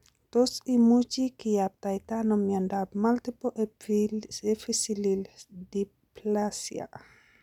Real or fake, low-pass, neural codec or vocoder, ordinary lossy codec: real; 19.8 kHz; none; none